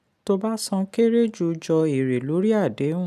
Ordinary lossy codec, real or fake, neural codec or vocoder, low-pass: none; real; none; 14.4 kHz